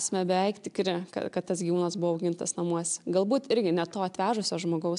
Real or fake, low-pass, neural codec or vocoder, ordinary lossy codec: real; 10.8 kHz; none; AAC, 96 kbps